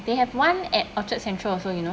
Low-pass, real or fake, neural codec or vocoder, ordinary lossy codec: none; real; none; none